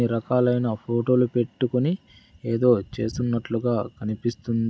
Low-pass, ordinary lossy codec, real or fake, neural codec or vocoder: none; none; real; none